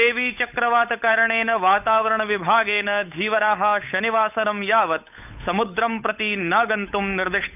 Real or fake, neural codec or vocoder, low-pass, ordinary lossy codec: fake; codec, 16 kHz, 8 kbps, FunCodec, trained on Chinese and English, 25 frames a second; 3.6 kHz; none